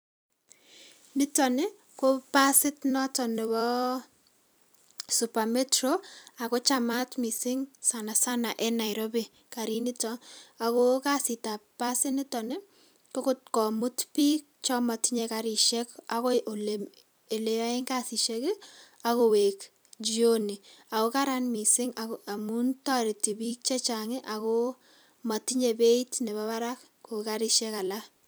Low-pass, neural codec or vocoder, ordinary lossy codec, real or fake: none; vocoder, 44.1 kHz, 128 mel bands every 256 samples, BigVGAN v2; none; fake